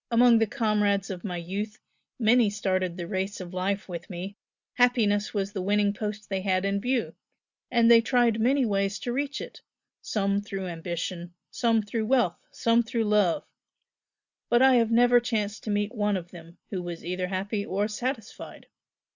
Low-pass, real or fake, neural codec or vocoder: 7.2 kHz; real; none